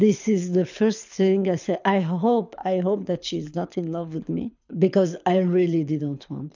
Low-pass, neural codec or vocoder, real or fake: 7.2 kHz; codec, 24 kHz, 6 kbps, HILCodec; fake